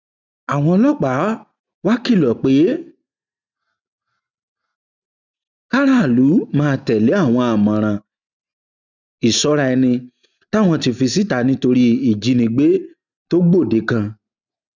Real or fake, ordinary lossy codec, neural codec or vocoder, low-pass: real; none; none; 7.2 kHz